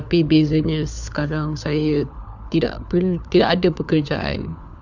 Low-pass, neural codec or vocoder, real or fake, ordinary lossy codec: 7.2 kHz; codec, 16 kHz, 4 kbps, FunCodec, trained on LibriTTS, 50 frames a second; fake; none